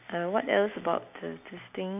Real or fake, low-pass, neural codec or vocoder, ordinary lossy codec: real; 3.6 kHz; none; none